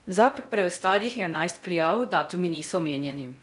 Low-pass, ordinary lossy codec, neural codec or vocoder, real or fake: 10.8 kHz; none; codec, 16 kHz in and 24 kHz out, 0.6 kbps, FocalCodec, streaming, 2048 codes; fake